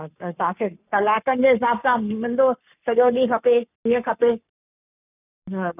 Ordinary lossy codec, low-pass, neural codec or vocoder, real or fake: none; 3.6 kHz; codec, 44.1 kHz, 7.8 kbps, Pupu-Codec; fake